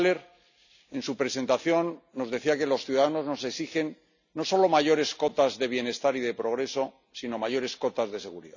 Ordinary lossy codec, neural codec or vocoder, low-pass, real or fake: none; none; 7.2 kHz; real